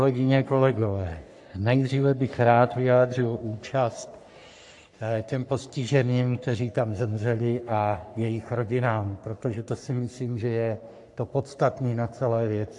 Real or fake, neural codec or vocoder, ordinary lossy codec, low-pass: fake; codec, 44.1 kHz, 3.4 kbps, Pupu-Codec; AAC, 64 kbps; 10.8 kHz